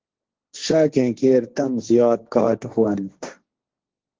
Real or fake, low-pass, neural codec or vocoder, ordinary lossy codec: fake; 7.2 kHz; codec, 16 kHz, 1.1 kbps, Voila-Tokenizer; Opus, 16 kbps